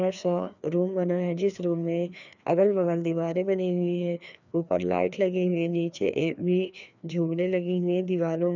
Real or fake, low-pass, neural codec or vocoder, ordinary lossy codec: fake; 7.2 kHz; codec, 16 kHz, 2 kbps, FreqCodec, larger model; none